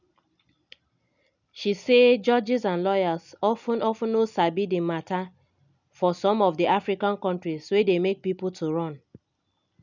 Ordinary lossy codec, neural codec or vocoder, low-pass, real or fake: none; none; 7.2 kHz; real